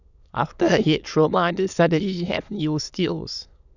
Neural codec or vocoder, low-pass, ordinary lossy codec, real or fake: autoencoder, 22.05 kHz, a latent of 192 numbers a frame, VITS, trained on many speakers; 7.2 kHz; none; fake